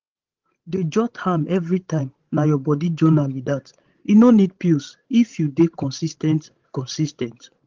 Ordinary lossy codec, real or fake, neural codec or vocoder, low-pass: Opus, 16 kbps; fake; codec, 16 kHz, 16 kbps, FreqCodec, larger model; 7.2 kHz